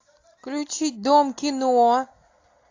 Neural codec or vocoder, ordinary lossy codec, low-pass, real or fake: none; AAC, 48 kbps; 7.2 kHz; real